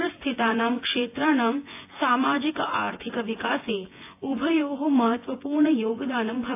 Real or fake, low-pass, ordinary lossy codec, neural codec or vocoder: fake; 3.6 kHz; AAC, 24 kbps; vocoder, 24 kHz, 100 mel bands, Vocos